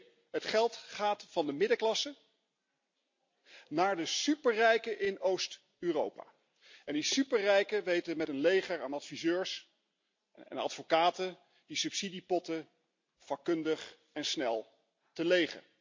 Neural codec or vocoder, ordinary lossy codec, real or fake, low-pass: none; MP3, 48 kbps; real; 7.2 kHz